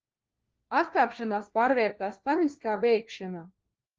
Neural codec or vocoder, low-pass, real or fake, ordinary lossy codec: codec, 16 kHz, 1 kbps, FunCodec, trained on LibriTTS, 50 frames a second; 7.2 kHz; fake; Opus, 16 kbps